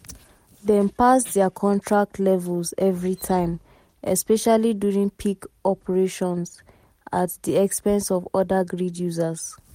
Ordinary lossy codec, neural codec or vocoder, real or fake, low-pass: MP3, 64 kbps; none; real; 19.8 kHz